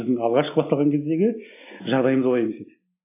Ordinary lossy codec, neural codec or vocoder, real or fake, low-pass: AAC, 24 kbps; none; real; 3.6 kHz